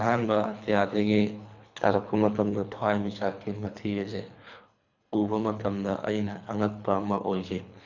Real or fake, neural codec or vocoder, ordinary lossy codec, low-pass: fake; codec, 24 kHz, 3 kbps, HILCodec; none; 7.2 kHz